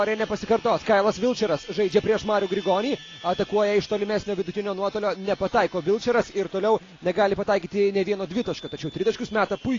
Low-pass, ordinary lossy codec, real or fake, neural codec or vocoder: 7.2 kHz; AAC, 32 kbps; real; none